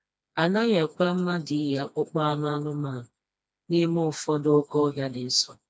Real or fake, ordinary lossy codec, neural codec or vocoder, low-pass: fake; none; codec, 16 kHz, 2 kbps, FreqCodec, smaller model; none